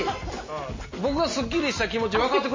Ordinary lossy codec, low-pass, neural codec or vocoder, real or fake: MP3, 32 kbps; 7.2 kHz; none; real